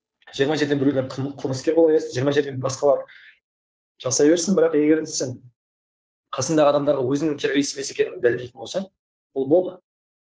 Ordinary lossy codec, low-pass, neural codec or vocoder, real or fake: none; none; codec, 16 kHz, 2 kbps, FunCodec, trained on Chinese and English, 25 frames a second; fake